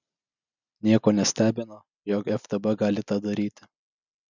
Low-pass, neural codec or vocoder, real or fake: 7.2 kHz; none; real